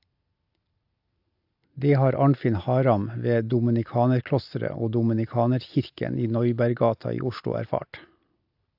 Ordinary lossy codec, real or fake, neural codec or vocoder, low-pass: AAC, 48 kbps; real; none; 5.4 kHz